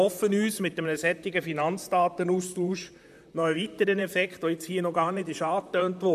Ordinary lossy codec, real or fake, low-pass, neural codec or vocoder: MP3, 96 kbps; fake; 14.4 kHz; vocoder, 44.1 kHz, 128 mel bands, Pupu-Vocoder